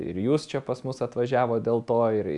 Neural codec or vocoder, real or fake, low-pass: none; real; 10.8 kHz